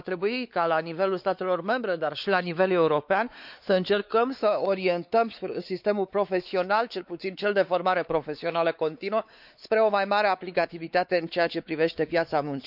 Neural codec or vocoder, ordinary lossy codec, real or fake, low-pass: codec, 16 kHz, 4 kbps, X-Codec, WavLM features, trained on Multilingual LibriSpeech; none; fake; 5.4 kHz